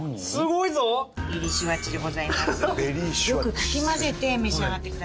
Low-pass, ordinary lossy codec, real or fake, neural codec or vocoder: none; none; real; none